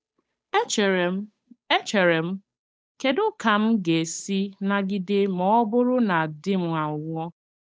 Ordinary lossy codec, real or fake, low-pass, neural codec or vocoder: none; fake; none; codec, 16 kHz, 8 kbps, FunCodec, trained on Chinese and English, 25 frames a second